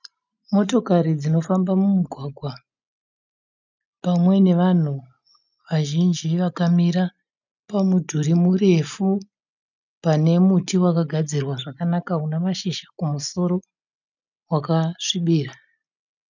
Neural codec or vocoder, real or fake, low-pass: none; real; 7.2 kHz